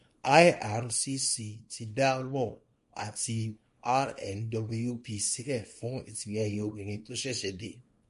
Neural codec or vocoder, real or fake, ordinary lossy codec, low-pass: codec, 24 kHz, 0.9 kbps, WavTokenizer, small release; fake; MP3, 48 kbps; 10.8 kHz